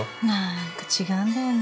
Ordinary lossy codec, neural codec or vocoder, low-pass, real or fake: none; none; none; real